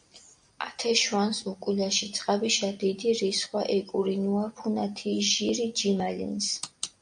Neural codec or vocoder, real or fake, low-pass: none; real; 9.9 kHz